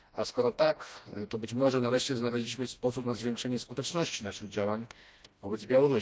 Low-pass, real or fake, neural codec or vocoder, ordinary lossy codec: none; fake; codec, 16 kHz, 1 kbps, FreqCodec, smaller model; none